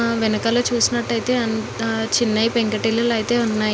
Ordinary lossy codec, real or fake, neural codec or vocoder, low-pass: none; real; none; none